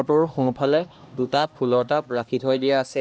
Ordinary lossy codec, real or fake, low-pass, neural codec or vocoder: none; fake; none; codec, 16 kHz, 1 kbps, X-Codec, HuBERT features, trained on LibriSpeech